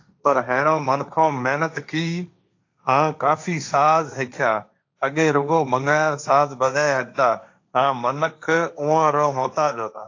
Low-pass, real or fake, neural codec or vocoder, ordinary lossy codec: 7.2 kHz; fake; codec, 16 kHz, 1.1 kbps, Voila-Tokenizer; AAC, 48 kbps